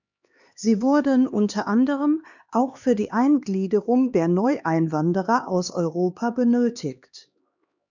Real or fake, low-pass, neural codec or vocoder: fake; 7.2 kHz; codec, 16 kHz, 2 kbps, X-Codec, HuBERT features, trained on LibriSpeech